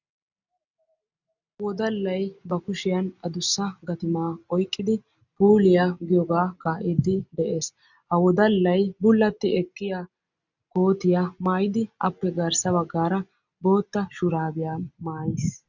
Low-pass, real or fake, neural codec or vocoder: 7.2 kHz; real; none